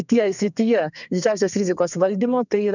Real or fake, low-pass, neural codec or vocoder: fake; 7.2 kHz; codec, 32 kHz, 1.9 kbps, SNAC